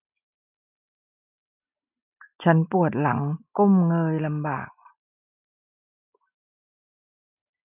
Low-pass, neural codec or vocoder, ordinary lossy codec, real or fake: 3.6 kHz; none; none; real